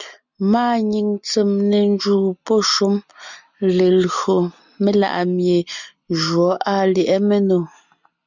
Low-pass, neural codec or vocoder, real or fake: 7.2 kHz; none; real